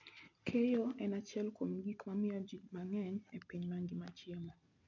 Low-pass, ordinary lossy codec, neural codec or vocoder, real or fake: 7.2 kHz; none; none; real